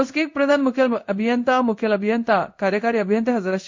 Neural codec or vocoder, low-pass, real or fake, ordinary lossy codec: codec, 16 kHz in and 24 kHz out, 1 kbps, XY-Tokenizer; 7.2 kHz; fake; MP3, 48 kbps